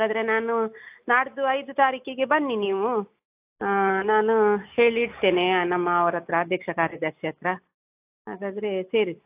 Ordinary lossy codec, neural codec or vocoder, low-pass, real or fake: AAC, 32 kbps; none; 3.6 kHz; real